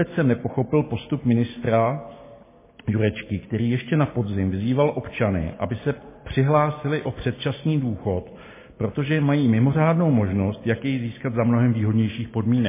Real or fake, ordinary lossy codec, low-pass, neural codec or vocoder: real; MP3, 16 kbps; 3.6 kHz; none